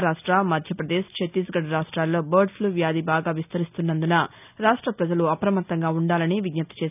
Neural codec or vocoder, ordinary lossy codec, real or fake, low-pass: none; none; real; 3.6 kHz